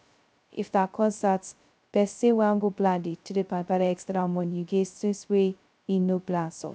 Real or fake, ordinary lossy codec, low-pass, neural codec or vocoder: fake; none; none; codec, 16 kHz, 0.2 kbps, FocalCodec